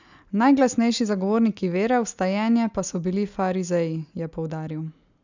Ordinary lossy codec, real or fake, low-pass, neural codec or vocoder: none; real; 7.2 kHz; none